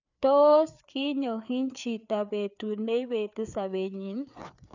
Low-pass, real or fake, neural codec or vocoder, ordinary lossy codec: 7.2 kHz; fake; codec, 16 kHz, 4 kbps, FreqCodec, larger model; none